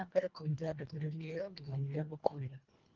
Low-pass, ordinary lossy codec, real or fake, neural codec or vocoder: 7.2 kHz; Opus, 24 kbps; fake; codec, 24 kHz, 1.5 kbps, HILCodec